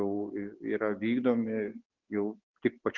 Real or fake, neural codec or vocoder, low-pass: real; none; 7.2 kHz